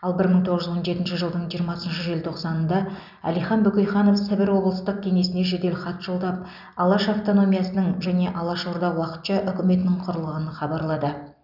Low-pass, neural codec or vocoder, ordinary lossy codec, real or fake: 5.4 kHz; none; none; real